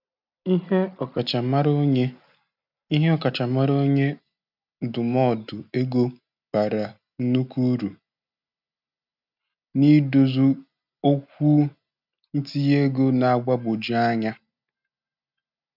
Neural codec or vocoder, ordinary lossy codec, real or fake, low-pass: none; none; real; 5.4 kHz